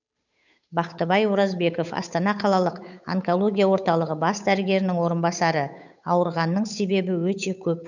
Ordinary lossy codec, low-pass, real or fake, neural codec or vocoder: none; 7.2 kHz; fake; codec, 16 kHz, 8 kbps, FunCodec, trained on Chinese and English, 25 frames a second